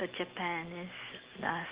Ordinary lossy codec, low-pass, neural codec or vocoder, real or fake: Opus, 16 kbps; 3.6 kHz; none; real